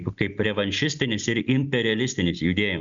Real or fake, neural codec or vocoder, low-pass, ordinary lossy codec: real; none; 7.2 kHz; Opus, 64 kbps